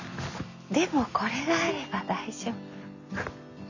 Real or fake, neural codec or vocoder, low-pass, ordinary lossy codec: real; none; 7.2 kHz; none